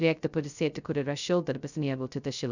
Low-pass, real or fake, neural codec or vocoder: 7.2 kHz; fake; codec, 16 kHz, 0.2 kbps, FocalCodec